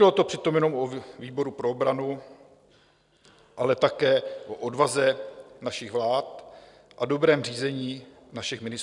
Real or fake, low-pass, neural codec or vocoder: real; 10.8 kHz; none